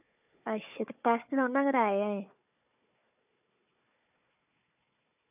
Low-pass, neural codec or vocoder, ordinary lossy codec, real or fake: 3.6 kHz; codec, 16 kHz, 4 kbps, FunCodec, trained on Chinese and English, 50 frames a second; none; fake